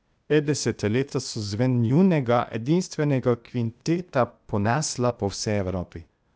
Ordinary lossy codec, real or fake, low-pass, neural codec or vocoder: none; fake; none; codec, 16 kHz, 0.8 kbps, ZipCodec